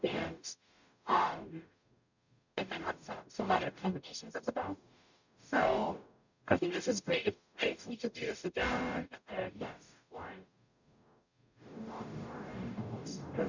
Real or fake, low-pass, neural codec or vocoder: fake; 7.2 kHz; codec, 44.1 kHz, 0.9 kbps, DAC